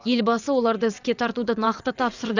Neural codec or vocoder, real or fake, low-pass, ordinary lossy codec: vocoder, 22.05 kHz, 80 mel bands, WaveNeXt; fake; 7.2 kHz; none